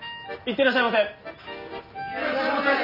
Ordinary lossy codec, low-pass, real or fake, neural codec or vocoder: none; 5.4 kHz; real; none